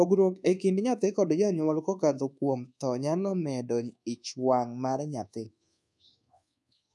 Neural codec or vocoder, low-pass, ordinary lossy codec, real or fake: codec, 24 kHz, 1.2 kbps, DualCodec; none; none; fake